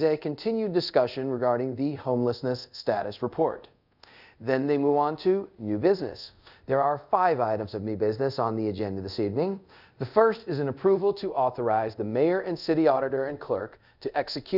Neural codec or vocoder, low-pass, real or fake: codec, 24 kHz, 0.5 kbps, DualCodec; 5.4 kHz; fake